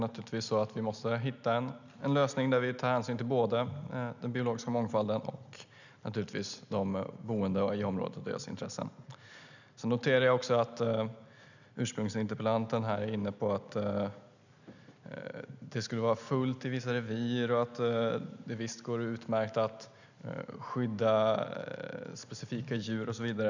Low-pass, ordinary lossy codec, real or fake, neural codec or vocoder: 7.2 kHz; none; real; none